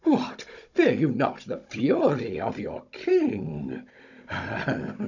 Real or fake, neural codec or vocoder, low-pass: fake; codec, 16 kHz, 16 kbps, FunCodec, trained on Chinese and English, 50 frames a second; 7.2 kHz